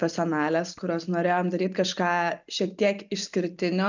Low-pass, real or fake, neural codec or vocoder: 7.2 kHz; real; none